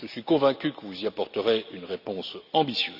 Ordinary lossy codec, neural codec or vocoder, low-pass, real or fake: none; none; 5.4 kHz; real